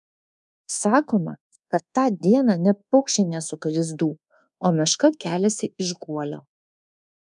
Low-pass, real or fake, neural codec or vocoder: 10.8 kHz; fake; codec, 24 kHz, 1.2 kbps, DualCodec